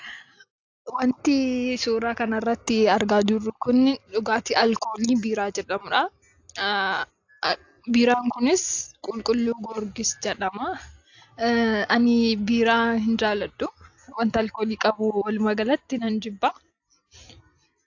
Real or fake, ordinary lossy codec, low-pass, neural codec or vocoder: real; AAC, 48 kbps; 7.2 kHz; none